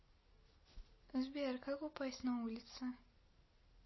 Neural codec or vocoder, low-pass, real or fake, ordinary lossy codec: none; 7.2 kHz; real; MP3, 24 kbps